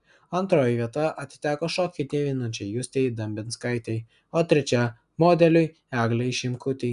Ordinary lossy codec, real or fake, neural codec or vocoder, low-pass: AAC, 96 kbps; real; none; 10.8 kHz